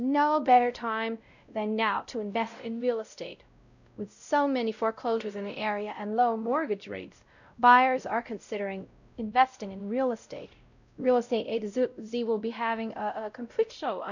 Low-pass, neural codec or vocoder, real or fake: 7.2 kHz; codec, 16 kHz, 0.5 kbps, X-Codec, WavLM features, trained on Multilingual LibriSpeech; fake